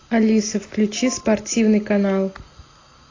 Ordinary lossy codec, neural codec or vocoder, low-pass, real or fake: AAC, 32 kbps; none; 7.2 kHz; real